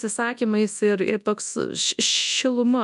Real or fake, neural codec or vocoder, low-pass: fake; codec, 24 kHz, 0.9 kbps, WavTokenizer, large speech release; 10.8 kHz